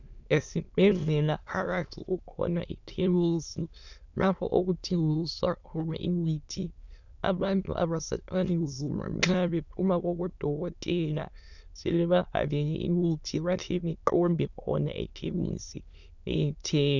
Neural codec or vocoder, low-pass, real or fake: autoencoder, 22.05 kHz, a latent of 192 numbers a frame, VITS, trained on many speakers; 7.2 kHz; fake